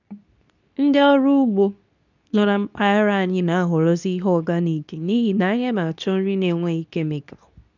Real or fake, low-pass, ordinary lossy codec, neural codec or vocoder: fake; 7.2 kHz; none; codec, 24 kHz, 0.9 kbps, WavTokenizer, medium speech release version 2